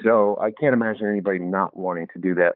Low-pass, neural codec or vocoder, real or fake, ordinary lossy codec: 5.4 kHz; codec, 16 kHz, 4 kbps, X-Codec, HuBERT features, trained on balanced general audio; fake; Opus, 24 kbps